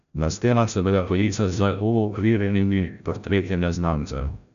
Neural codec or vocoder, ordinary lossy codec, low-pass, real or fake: codec, 16 kHz, 0.5 kbps, FreqCodec, larger model; none; 7.2 kHz; fake